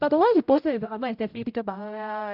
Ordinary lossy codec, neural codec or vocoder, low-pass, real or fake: AAC, 48 kbps; codec, 16 kHz, 0.5 kbps, X-Codec, HuBERT features, trained on general audio; 5.4 kHz; fake